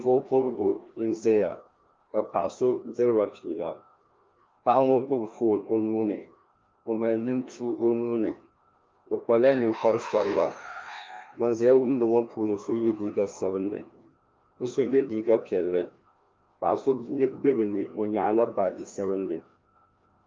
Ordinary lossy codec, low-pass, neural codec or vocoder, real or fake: Opus, 24 kbps; 7.2 kHz; codec, 16 kHz, 1 kbps, FreqCodec, larger model; fake